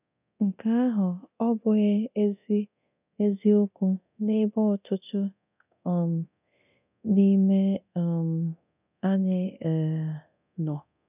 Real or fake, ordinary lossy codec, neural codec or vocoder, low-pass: fake; none; codec, 24 kHz, 0.9 kbps, DualCodec; 3.6 kHz